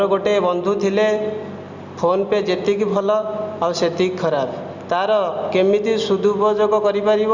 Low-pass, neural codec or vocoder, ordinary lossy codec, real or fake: 7.2 kHz; none; Opus, 64 kbps; real